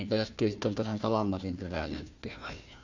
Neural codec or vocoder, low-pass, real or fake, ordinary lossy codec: codec, 16 kHz, 1 kbps, FunCodec, trained on Chinese and English, 50 frames a second; 7.2 kHz; fake; none